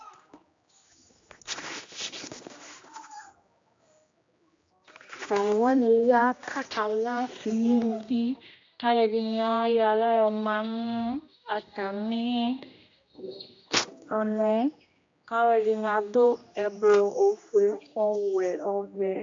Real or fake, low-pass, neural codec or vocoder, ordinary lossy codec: fake; 7.2 kHz; codec, 16 kHz, 1 kbps, X-Codec, HuBERT features, trained on general audio; AAC, 48 kbps